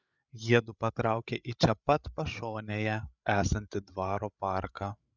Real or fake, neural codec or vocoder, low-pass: fake; codec, 16 kHz, 8 kbps, FreqCodec, larger model; 7.2 kHz